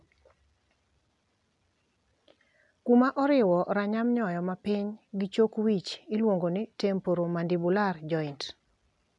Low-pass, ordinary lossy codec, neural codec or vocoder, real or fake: 10.8 kHz; none; none; real